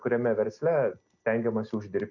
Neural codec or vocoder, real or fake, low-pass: none; real; 7.2 kHz